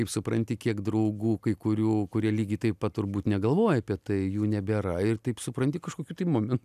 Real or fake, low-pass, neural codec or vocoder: real; 14.4 kHz; none